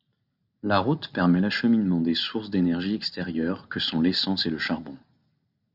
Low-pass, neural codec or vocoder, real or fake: 5.4 kHz; none; real